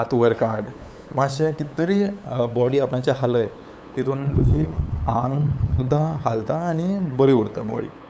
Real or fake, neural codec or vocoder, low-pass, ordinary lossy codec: fake; codec, 16 kHz, 8 kbps, FunCodec, trained on LibriTTS, 25 frames a second; none; none